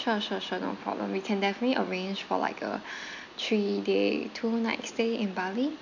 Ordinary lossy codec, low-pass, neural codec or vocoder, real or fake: none; 7.2 kHz; none; real